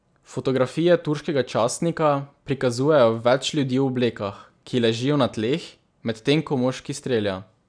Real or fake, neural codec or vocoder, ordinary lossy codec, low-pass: real; none; none; 9.9 kHz